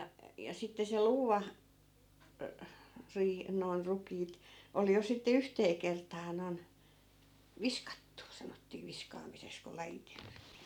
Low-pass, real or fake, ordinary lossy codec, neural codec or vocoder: 19.8 kHz; real; none; none